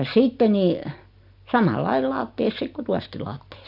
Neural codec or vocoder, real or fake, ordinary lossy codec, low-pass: none; real; none; 5.4 kHz